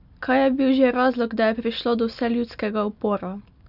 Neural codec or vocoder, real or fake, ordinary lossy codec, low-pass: none; real; none; 5.4 kHz